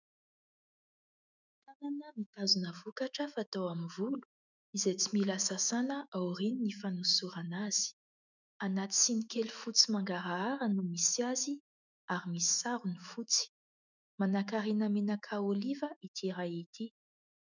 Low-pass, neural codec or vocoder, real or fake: 7.2 kHz; autoencoder, 48 kHz, 128 numbers a frame, DAC-VAE, trained on Japanese speech; fake